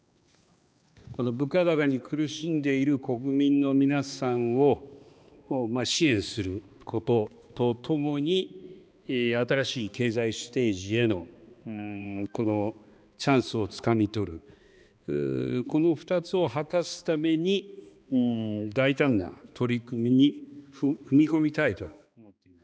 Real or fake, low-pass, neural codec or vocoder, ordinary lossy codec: fake; none; codec, 16 kHz, 2 kbps, X-Codec, HuBERT features, trained on balanced general audio; none